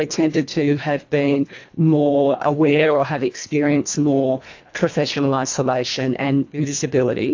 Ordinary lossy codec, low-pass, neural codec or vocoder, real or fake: AAC, 48 kbps; 7.2 kHz; codec, 24 kHz, 1.5 kbps, HILCodec; fake